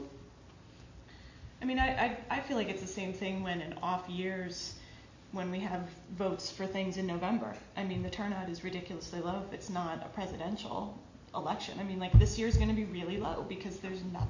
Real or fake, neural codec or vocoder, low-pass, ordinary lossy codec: real; none; 7.2 kHz; AAC, 48 kbps